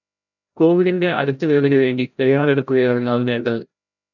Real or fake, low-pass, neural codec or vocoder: fake; 7.2 kHz; codec, 16 kHz, 0.5 kbps, FreqCodec, larger model